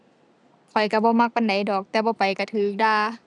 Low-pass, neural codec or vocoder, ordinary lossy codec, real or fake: none; none; none; real